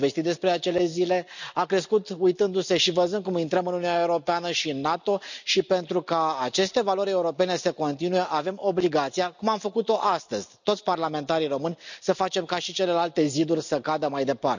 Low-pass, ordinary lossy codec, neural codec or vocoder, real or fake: 7.2 kHz; none; none; real